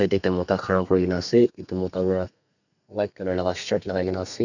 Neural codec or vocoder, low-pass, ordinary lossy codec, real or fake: codec, 32 kHz, 1.9 kbps, SNAC; 7.2 kHz; none; fake